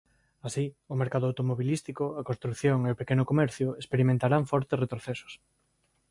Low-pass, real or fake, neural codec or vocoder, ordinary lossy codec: 10.8 kHz; real; none; MP3, 64 kbps